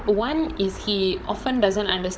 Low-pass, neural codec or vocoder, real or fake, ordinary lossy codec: none; codec, 16 kHz, 16 kbps, FunCodec, trained on Chinese and English, 50 frames a second; fake; none